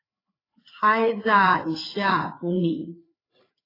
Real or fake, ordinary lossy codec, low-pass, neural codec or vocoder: fake; AAC, 24 kbps; 5.4 kHz; codec, 16 kHz, 4 kbps, FreqCodec, larger model